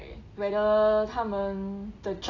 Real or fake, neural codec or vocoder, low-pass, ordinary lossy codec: real; none; 7.2 kHz; AAC, 32 kbps